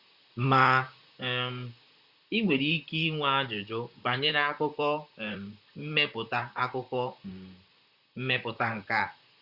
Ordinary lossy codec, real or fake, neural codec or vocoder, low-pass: Opus, 64 kbps; fake; vocoder, 44.1 kHz, 128 mel bands, Pupu-Vocoder; 5.4 kHz